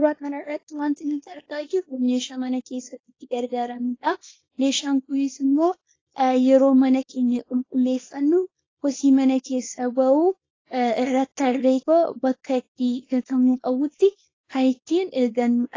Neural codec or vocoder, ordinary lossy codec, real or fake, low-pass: codec, 24 kHz, 0.9 kbps, WavTokenizer, small release; AAC, 32 kbps; fake; 7.2 kHz